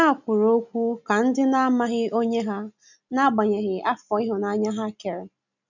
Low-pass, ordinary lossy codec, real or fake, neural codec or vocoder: 7.2 kHz; none; real; none